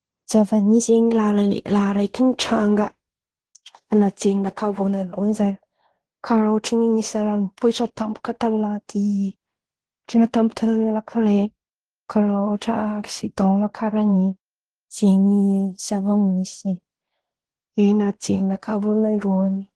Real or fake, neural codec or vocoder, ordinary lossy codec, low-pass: fake; codec, 16 kHz in and 24 kHz out, 0.9 kbps, LongCat-Audio-Codec, fine tuned four codebook decoder; Opus, 16 kbps; 10.8 kHz